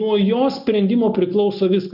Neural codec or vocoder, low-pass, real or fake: none; 5.4 kHz; real